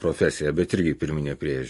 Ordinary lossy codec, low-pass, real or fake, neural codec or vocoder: MP3, 48 kbps; 14.4 kHz; fake; vocoder, 44.1 kHz, 128 mel bands every 256 samples, BigVGAN v2